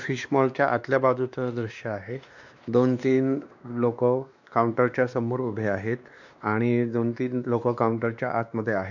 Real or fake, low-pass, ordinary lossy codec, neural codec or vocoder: fake; 7.2 kHz; none; codec, 16 kHz, 2 kbps, X-Codec, WavLM features, trained on Multilingual LibriSpeech